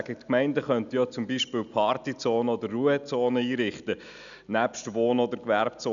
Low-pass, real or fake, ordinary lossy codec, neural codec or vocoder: 7.2 kHz; real; none; none